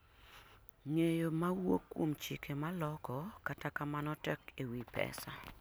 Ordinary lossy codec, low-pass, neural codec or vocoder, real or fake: none; none; none; real